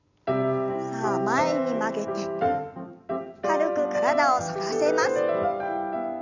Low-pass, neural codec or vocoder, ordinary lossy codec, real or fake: 7.2 kHz; none; none; real